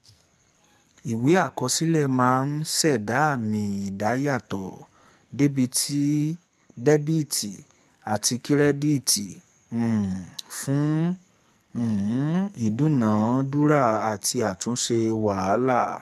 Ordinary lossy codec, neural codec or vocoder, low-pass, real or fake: MP3, 96 kbps; codec, 44.1 kHz, 2.6 kbps, SNAC; 14.4 kHz; fake